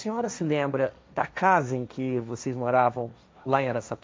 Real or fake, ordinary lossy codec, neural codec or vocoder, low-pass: fake; none; codec, 16 kHz, 1.1 kbps, Voila-Tokenizer; none